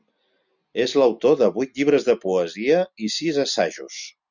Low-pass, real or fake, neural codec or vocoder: 7.2 kHz; real; none